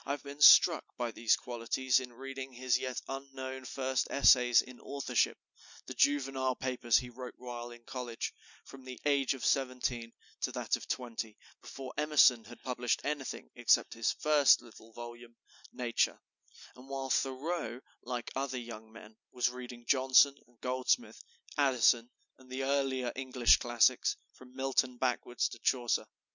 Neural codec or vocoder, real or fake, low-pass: none; real; 7.2 kHz